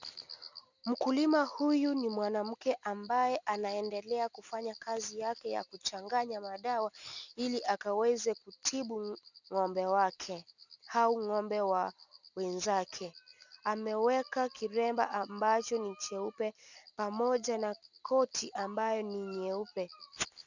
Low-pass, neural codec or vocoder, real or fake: 7.2 kHz; none; real